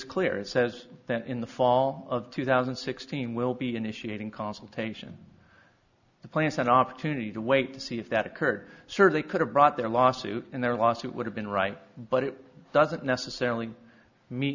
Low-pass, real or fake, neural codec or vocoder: 7.2 kHz; real; none